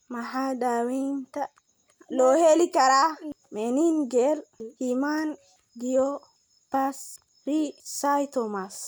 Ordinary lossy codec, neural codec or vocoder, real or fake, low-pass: none; none; real; none